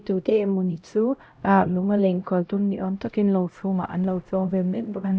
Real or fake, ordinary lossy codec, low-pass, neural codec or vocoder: fake; none; none; codec, 16 kHz, 0.5 kbps, X-Codec, HuBERT features, trained on LibriSpeech